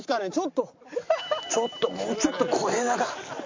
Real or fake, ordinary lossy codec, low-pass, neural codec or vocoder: real; MP3, 48 kbps; 7.2 kHz; none